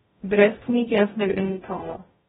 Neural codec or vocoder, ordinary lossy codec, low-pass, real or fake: codec, 44.1 kHz, 0.9 kbps, DAC; AAC, 16 kbps; 19.8 kHz; fake